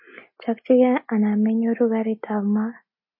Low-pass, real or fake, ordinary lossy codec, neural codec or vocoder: 5.4 kHz; real; MP3, 24 kbps; none